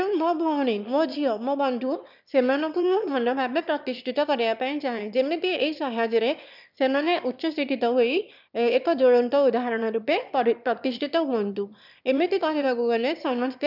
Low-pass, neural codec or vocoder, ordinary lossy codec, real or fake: 5.4 kHz; autoencoder, 22.05 kHz, a latent of 192 numbers a frame, VITS, trained on one speaker; none; fake